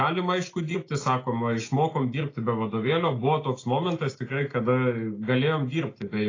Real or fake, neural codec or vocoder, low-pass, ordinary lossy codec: real; none; 7.2 kHz; AAC, 32 kbps